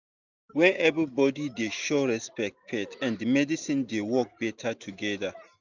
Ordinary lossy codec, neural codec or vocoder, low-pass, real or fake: none; none; 7.2 kHz; real